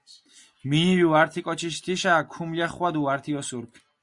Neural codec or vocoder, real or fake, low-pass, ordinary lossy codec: none; real; 10.8 kHz; Opus, 64 kbps